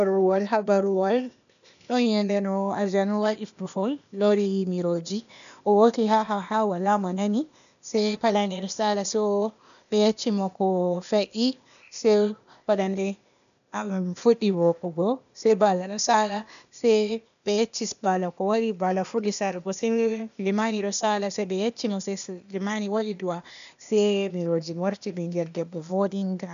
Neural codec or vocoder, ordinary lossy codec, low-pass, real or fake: codec, 16 kHz, 0.8 kbps, ZipCodec; none; 7.2 kHz; fake